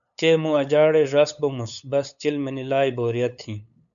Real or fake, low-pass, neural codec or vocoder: fake; 7.2 kHz; codec, 16 kHz, 8 kbps, FunCodec, trained on LibriTTS, 25 frames a second